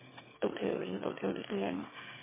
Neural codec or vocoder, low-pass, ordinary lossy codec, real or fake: autoencoder, 22.05 kHz, a latent of 192 numbers a frame, VITS, trained on one speaker; 3.6 kHz; MP3, 16 kbps; fake